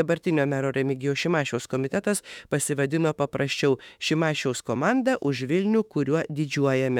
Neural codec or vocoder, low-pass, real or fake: autoencoder, 48 kHz, 32 numbers a frame, DAC-VAE, trained on Japanese speech; 19.8 kHz; fake